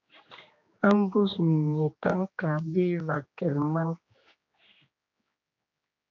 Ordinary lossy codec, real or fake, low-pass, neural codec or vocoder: AAC, 48 kbps; fake; 7.2 kHz; codec, 16 kHz, 2 kbps, X-Codec, HuBERT features, trained on general audio